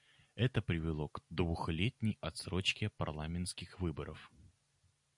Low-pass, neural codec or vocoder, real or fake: 10.8 kHz; none; real